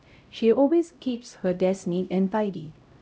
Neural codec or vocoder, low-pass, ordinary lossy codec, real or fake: codec, 16 kHz, 0.5 kbps, X-Codec, HuBERT features, trained on LibriSpeech; none; none; fake